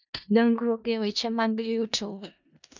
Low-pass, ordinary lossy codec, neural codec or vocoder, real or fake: 7.2 kHz; none; codec, 16 kHz in and 24 kHz out, 0.4 kbps, LongCat-Audio-Codec, four codebook decoder; fake